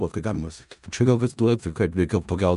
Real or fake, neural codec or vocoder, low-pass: fake; codec, 16 kHz in and 24 kHz out, 0.4 kbps, LongCat-Audio-Codec, four codebook decoder; 10.8 kHz